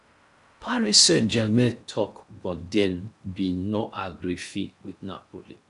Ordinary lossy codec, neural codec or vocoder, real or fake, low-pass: none; codec, 16 kHz in and 24 kHz out, 0.6 kbps, FocalCodec, streaming, 4096 codes; fake; 10.8 kHz